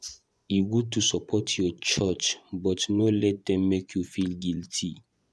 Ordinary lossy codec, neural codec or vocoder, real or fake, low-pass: none; vocoder, 24 kHz, 100 mel bands, Vocos; fake; none